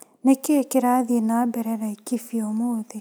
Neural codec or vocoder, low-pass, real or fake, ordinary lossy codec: none; none; real; none